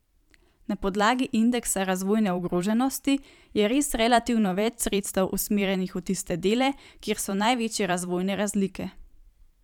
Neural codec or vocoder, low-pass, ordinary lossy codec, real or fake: vocoder, 44.1 kHz, 128 mel bands every 512 samples, BigVGAN v2; 19.8 kHz; none; fake